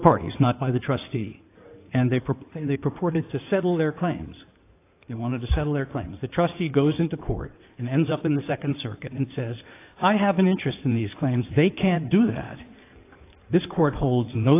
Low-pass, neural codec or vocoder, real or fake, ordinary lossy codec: 3.6 kHz; codec, 16 kHz in and 24 kHz out, 2.2 kbps, FireRedTTS-2 codec; fake; AAC, 24 kbps